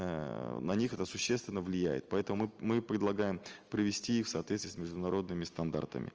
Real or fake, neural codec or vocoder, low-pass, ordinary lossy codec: real; none; 7.2 kHz; Opus, 24 kbps